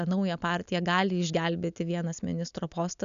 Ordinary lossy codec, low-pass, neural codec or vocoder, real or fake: MP3, 96 kbps; 7.2 kHz; none; real